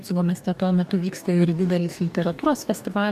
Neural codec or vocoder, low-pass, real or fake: codec, 44.1 kHz, 2.6 kbps, DAC; 14.4 kHz; fake